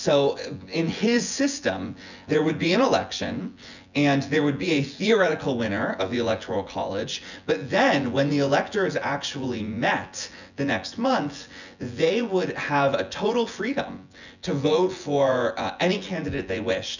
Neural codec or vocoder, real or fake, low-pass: vocoder, 24 kHz, 100 mel bands, Vocos; fake; 7.2 kHz